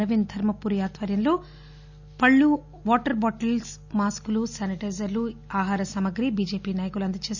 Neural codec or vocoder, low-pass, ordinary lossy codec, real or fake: none; 7.2 kHz; none; real